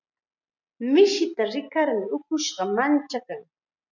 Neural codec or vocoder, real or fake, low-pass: none; real; 7.2 kHz